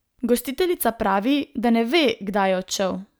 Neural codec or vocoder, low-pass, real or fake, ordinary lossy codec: none; none; real; none